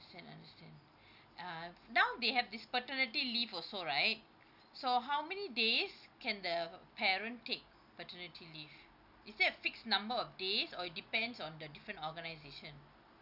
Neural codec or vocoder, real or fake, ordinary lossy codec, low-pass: none; real; none; 5.4 kHz